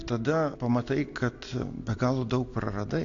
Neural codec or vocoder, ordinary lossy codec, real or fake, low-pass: none; AAC, 48 kbps; real; 7.2 kHz